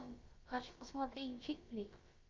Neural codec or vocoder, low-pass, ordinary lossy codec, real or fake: codec, 16 kHz, about 1 kbps, DyCAST, with the encoder's durations; 7.2 kHz; Opus, 32 kbps; fake